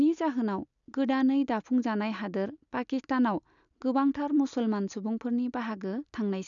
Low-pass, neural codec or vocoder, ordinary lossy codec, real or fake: 7.2 kHz; none; none; real